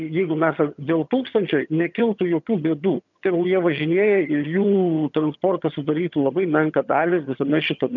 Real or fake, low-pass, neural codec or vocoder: fake; 7.2 kHz; vocoder, 22.05 kHz, 80 mel bands, HiFi-GAN